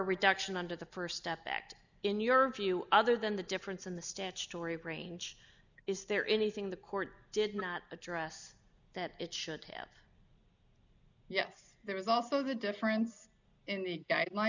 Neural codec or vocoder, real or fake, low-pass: none; real; 7.2 kHz